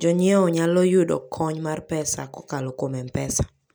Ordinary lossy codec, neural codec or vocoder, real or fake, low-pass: none; none; real; none